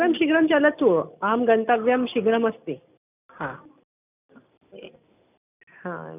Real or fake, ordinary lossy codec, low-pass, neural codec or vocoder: real; none; 3.6 kHz; none